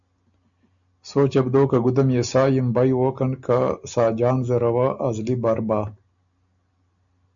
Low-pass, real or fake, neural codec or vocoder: 7.2 kHz; real; none